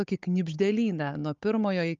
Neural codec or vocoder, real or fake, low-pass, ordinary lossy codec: codec, 16 kHz, 16 kbps, FunCodec, trained on Chinese and English, 50 frames a second; fake; 7.2 kHz; Opus, 32 kbps